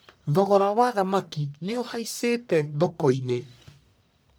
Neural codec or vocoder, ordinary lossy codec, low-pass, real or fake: codec, 44.1 kHz, 1.7 kbps, Pupu-Codec; none; none; fake